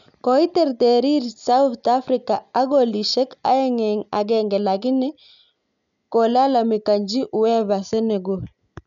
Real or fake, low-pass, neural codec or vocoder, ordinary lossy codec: real; 7.2 kHz; none; none